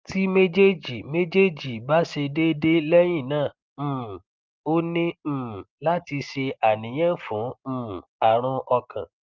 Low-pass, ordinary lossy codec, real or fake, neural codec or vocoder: 7.2 kHz; Opus, 32 kbps; real; none